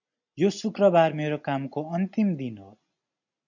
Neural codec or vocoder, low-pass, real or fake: none; 7.2 kHz; real